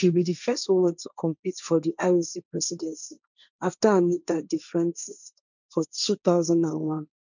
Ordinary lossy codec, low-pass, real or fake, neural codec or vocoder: none; 7.2 kHz; fake; codec, 16 kHz, 1.1 kbps, Voila-Tokenizer